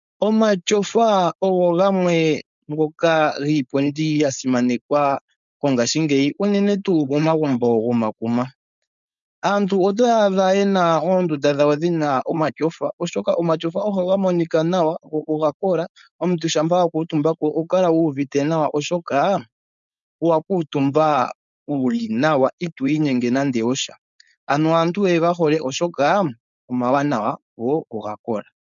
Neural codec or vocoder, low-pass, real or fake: codec, 16 kHz, 4.8 kbps, FACodec; 7.2 kHz; fake